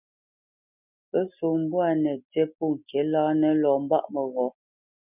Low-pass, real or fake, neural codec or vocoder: 3.6 kHz; real; none